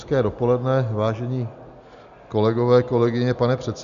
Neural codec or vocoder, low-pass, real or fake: none; 7.2 kHz; real